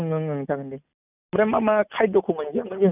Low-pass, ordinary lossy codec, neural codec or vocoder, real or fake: 3.6 kHz; none; none; real